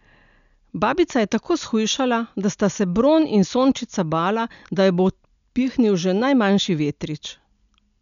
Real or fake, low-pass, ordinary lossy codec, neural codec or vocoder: real; 7.2 kHz; none; none